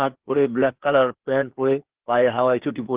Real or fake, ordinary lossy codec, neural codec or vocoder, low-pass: fake; Opus, 16 kbps; codec, 16 kHz, 0.8 kbps, ZipCodec; 3.6 kHz